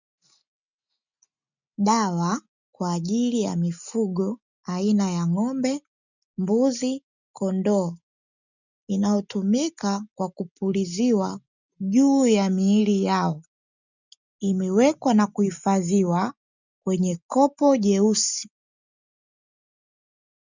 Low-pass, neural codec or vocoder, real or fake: 7.2 kHz; none; real